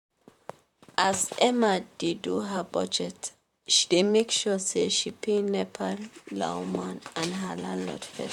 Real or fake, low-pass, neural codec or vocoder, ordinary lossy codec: fake; 19.8 kHz; vocoder, 44.1 kHz, 128 mel bands, Pupu-Vocoder; none